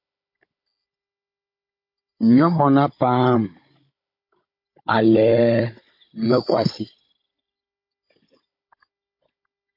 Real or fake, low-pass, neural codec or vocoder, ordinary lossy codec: fake; 5.4 kHz; codec, 16 kHz, 16 kbps, FunCodec, trained on Chinese and English, 50 frames a second; MP3, 32 kbps